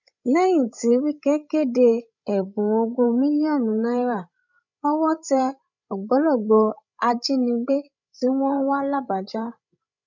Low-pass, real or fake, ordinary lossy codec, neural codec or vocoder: 7.2 kHz; fake; none; codec, 16 kHz, 16 kbps, FreqCodec, larger model